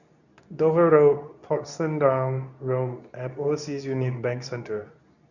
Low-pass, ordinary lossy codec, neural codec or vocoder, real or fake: 7.2 kHz; none; codec, 24 kHz, 0.9 kbps, WavTokenizer, medium speech release version 2; fake